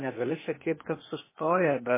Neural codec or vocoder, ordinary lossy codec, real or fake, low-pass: codec, 16 kHz, 0.8 kbps, ZipCodec; MP3, 16 kbps; fake; 3.6 kHz